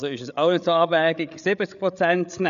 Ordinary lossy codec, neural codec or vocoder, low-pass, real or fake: none; codec, 16 kHz, 8 kbps, FreqCodec, larger model; 7.2 kHz; fake